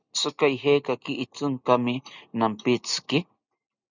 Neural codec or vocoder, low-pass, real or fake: none; 7.2 kHz; real